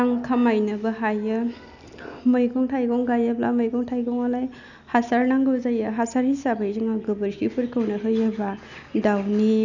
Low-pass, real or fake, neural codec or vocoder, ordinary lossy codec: 7.2 kHz; real; none; none